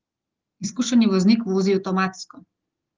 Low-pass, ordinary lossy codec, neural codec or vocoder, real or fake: 7.2 kHz; Opus, 16 kbps; none; real